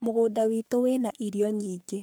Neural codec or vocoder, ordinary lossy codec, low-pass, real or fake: codec, 44.1 kHz, 3.4 kbps, Pupu-Codec; none; none; fake